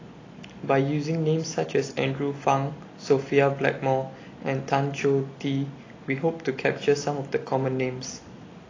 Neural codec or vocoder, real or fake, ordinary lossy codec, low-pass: none; real; AAC, 32 kbps; 7.2 kHz